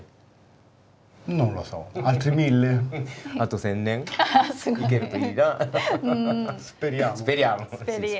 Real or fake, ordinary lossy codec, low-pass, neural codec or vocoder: real; none; none; none